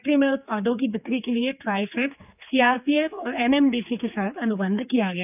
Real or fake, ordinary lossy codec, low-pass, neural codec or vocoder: fake; none; 3.6 kHz; codec, 16 kHz, 4 kbps, X-Codec, HuBERT features, trained on general audio